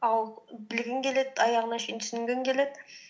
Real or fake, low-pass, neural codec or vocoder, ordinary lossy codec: real; none; none; none